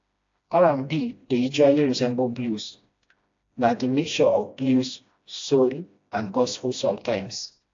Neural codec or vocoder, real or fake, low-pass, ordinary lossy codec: codec, 16 kHz, 1 kbps, FreqCodec, smaller model; fake; 7.2 kHz; AAC, 48 kbps